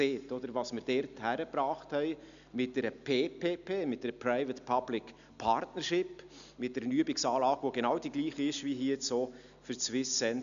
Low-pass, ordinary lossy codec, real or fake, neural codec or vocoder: 7.2 kHz; MP3, 96 kbps; real; none